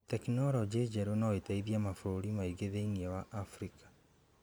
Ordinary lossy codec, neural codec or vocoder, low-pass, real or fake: none; vocoder, 44.1 kHz, 128 mel bands every 512 samples, BigVGAN v2; none; fake